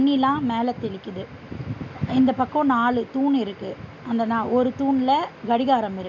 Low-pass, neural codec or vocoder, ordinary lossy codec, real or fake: 7.2 kHz; none; none; real